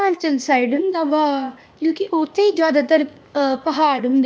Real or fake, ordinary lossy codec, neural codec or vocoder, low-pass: fake; none; codec, 16 kHz, 0.8 kbps, ZipCodec; none